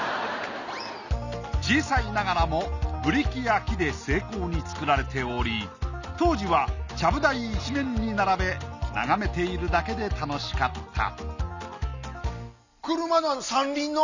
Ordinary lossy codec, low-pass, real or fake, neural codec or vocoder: none; 7.2 kHz; real; none